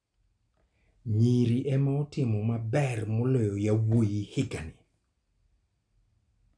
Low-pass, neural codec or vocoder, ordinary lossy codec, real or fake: 9.9 kHz; none; none; real